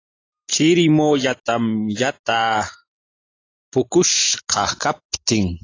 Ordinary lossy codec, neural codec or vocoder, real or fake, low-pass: AAC, 32 kbps; none; real; 7.2 kHz